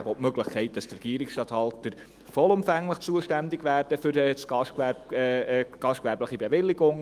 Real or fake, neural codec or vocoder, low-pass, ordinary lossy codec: fake; autoencoder, 48 kHz, 128 numbers a frame, DAC-VAE, trained on Japanese speech; 14.4 kHz; Opus, 32 kbps